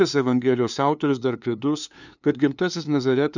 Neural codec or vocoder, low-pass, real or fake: codec, 16 kHz, 2 kbps, FunCodec, trained on LibriTTS, 25 frames a second; 7.2 kHz; fake